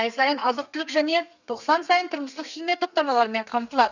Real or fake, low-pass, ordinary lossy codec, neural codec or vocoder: fake; 7.2 kHz; none; codec, 32 kHz, 1.9 kbps, SNAC